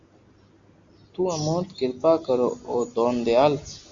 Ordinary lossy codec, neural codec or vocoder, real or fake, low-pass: Opus, 64 kbps; none; real; 7.2 kHz